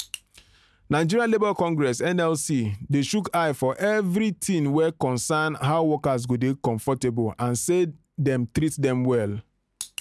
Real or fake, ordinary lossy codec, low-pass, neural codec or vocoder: real; none; none; none